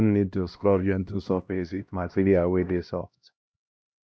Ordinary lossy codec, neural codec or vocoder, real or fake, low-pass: none; codec, 16 kHz, 1 kbps, X-Codec, HuBERT features, trained on LibriSpeech; fake; none